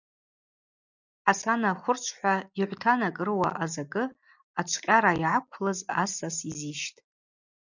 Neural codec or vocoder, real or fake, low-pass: none; real; 7.2 kHz